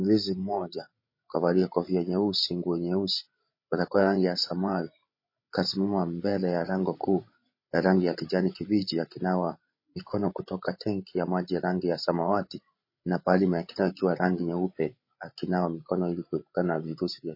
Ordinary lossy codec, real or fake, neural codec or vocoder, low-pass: MP3, 24 kbps; fake; codec, 16 kHz, 16 kbps, FreqCodec, larger model; 5.4 kHz